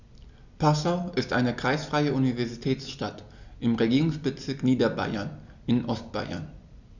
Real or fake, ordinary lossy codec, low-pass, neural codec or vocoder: real; none; 7.2 kHz; none